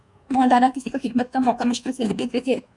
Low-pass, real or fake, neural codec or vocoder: 10.8 kHz; fake; codec, 24 kHz, 1.2 kbps, DualCodec